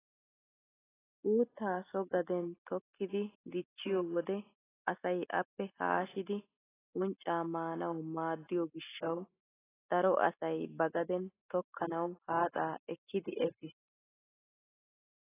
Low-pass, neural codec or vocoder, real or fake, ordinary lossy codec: 3.6 kHz; none; real; AAC, 16 kbps